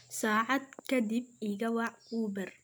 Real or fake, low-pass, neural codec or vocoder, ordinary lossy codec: real; none; none; none